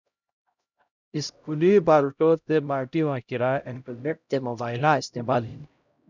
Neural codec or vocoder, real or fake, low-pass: codec, 16 kHz, 0.5 kbps, X-Codec, HuBERT features, trained on LibriSpeech; fake; 7.2 kHz